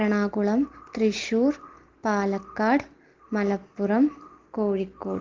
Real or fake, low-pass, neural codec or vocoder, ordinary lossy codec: real; 7.2 kHz; none; Opus, 16 kbps